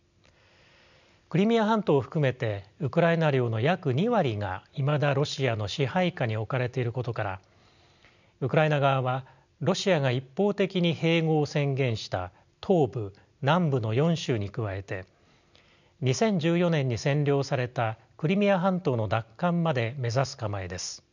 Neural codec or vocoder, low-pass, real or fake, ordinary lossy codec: none; 7.2 kHz; real; none